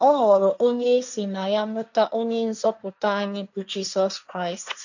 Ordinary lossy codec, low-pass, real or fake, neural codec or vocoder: none; 7.2 kHz; fake; codec, 16 kHz, 1.1 kbps, Voila-Tokenizer